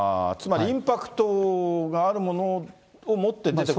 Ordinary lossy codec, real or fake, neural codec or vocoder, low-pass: none; real; none; none